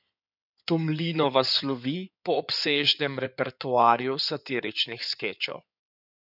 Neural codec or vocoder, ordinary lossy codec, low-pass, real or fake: codec, 16 kHz in and 24 kHz out, 2.2 kbps, FireRedTTS-2 codec; none; 5.4 kHz; fake